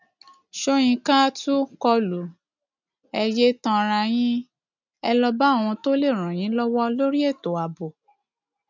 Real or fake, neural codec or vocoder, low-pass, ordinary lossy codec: real; none; 7.2 kHz; none